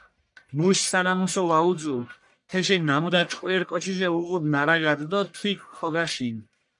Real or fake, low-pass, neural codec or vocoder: fake; 10.8 kHz; codec, 44.1 kHz, 1.7 kbps, Pupu-Codec